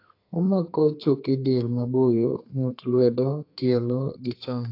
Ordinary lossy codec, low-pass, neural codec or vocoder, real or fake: AAC, 32 kbps; 5.4 kHz; codec, 32 kHz, 1.9 kbps, SNAC; fake